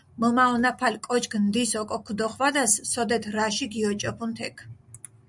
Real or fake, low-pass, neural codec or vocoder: real; 10.8 kHz; none